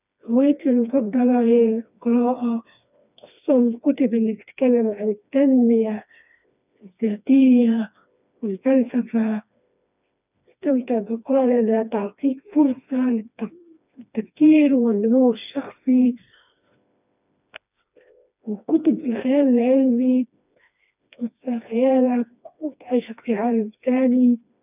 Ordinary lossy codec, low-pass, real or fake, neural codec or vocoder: none; 3.6 kHz; fake; codec, 16 kHz, 2 kbps, FreqCodec, smaller model